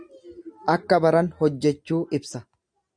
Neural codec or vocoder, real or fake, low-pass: none; real; 9.9 kHz